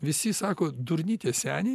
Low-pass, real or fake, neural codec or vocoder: 14.4 kHz; real; none